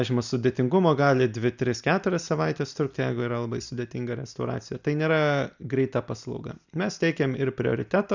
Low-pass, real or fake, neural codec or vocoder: 7.2 kHz; real; none